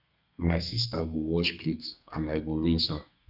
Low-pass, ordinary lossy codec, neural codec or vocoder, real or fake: 5.4 kHz; none; codec, 32 kHz, 1.9 kbps, SNAC; fake